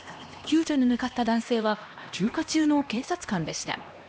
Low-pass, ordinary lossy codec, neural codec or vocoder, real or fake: none; none; codec, 16 kHz, 1 kbps, X-Codec, HuBERT features, trained on LibriSpeech; fake